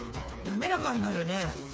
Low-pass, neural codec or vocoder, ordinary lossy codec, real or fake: none; codec, 16 kHz, 4 kbps, FreqCodec, smaller model; none; fake